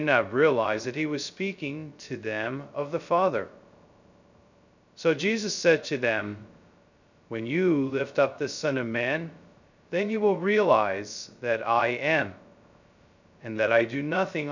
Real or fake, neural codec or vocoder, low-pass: fake; codec, 16 kHz, 0.2 kbps, FocalCodec; 7.2 kHz